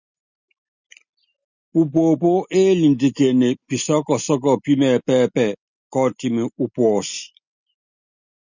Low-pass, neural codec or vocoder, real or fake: 7.2 kHz; none; real